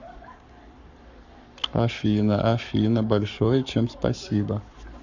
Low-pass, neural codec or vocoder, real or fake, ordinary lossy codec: 7.2 kHz; codec, 44.1 kHz, 7.8 kbps, Pupu-Codec; fake; none